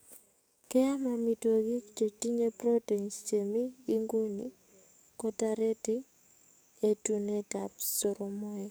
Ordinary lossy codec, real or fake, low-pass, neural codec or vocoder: none; fake; none; codec, 44.1 kHz, 7.8 kbps, DAC